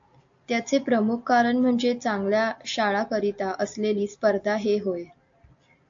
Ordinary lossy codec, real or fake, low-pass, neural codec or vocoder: AAC, 64 kbps; real; 7.2 kHz; none